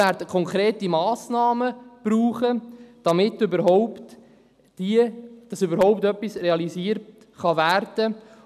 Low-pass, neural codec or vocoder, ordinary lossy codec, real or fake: 14.4 kHz; none; none; real